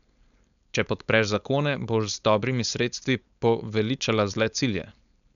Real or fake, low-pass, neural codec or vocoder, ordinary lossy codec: fake; 7.2 kHz; codec, 16 kHz, 4.8 kbps, FACodec; none